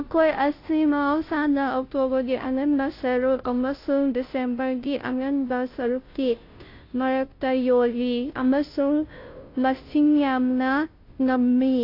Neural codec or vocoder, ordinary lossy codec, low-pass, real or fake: codec, 16 kHz, 0.5 kbps, FunCodec, trained on Chinese and English, 25 frames a second; AAC, 32 kbps; 5.4 kHz; fake